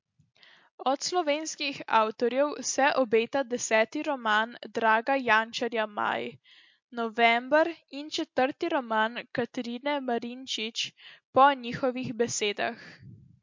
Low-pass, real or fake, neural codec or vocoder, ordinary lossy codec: 7.2 kHz; real; none; MP3, 48 kbps